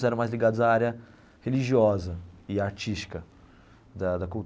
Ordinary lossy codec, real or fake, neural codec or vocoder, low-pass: none; real; none; none